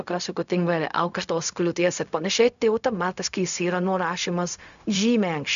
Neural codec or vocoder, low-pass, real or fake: codec, 16 kHz, 0.4 kbps, LongCat-Audio-Codec; 7.2 kHz; fake